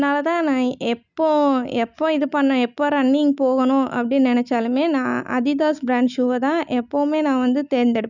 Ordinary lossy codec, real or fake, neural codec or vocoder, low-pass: none; real; none; 7.2 kHz